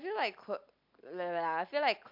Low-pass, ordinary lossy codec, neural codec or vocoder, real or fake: 5.4 kHz; MP3, 48 kbps; none; real